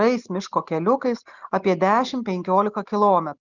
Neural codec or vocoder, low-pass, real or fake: none; 7.2 kHz; real